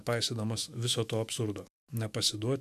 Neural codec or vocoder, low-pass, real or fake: autoencoder, 48 kHz, 128 numbers a frame, DAC-VAE, trained on Japanese speech; 14.4 kHz; fake